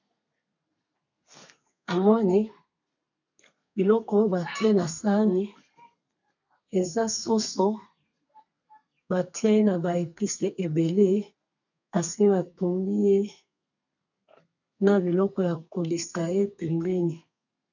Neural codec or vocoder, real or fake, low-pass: codec, 32 kHz, 1.9 kbps, SNAC; fake; 7.2 kHz